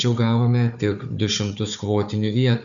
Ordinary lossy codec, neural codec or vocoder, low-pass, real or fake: MP3, 96 kbps; codec, 16 kHz, 4 kbps, FunCodec, trained on Chinese and English, 50 frames a second; 7.2 kHz; fake